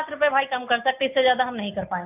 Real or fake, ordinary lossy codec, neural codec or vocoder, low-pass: real; AAC, 24 kbps; none; 3.6 kHz